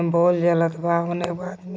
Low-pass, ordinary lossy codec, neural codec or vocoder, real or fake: none; none; none; real